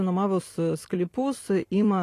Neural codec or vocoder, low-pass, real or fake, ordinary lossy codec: vocoder, 44.1 kHz, 128 mel bands every 256 samples, BigVGAN v2; 14.4 kHz; fake; AAC, 48 kbps